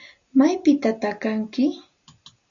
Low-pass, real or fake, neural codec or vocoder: 7.2 kHz; real; none